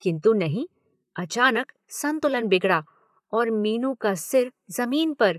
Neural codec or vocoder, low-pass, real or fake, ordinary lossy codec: vocoder, 44.1 kHz, 128 mel bands, Pupu-Vocoder; 14.4 kHz; fake; none